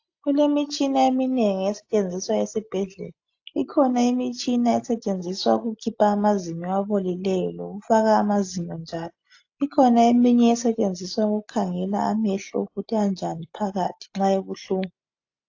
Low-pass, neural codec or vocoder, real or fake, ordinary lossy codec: 7.2 kHz; none; real; AAC, 48 kbps